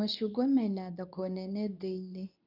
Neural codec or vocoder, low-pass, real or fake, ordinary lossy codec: codec, 24 kHz, 0.9 kbps, WavTokenizer, medium speech release version 2; 5.4 kHz; fake; none